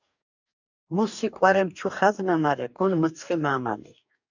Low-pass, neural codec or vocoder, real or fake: 7.2 kHz; codec, 44.1 kHz, 2.6 kbps, DAC; fake